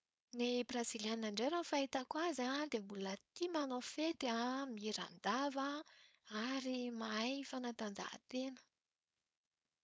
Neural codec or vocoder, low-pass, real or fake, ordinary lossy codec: codec, 16 kHz, 4.8 kbps, FACodec; none; fake; none